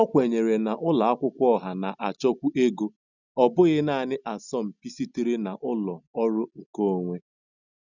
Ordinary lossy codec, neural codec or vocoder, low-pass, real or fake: none; none; 7.2 kHz; real